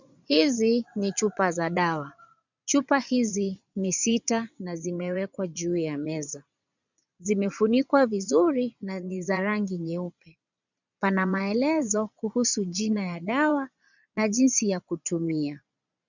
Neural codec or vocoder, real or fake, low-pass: vocoder, 22.05 kHz, 80 mel bands, Vocos; fake; 7.2 kHz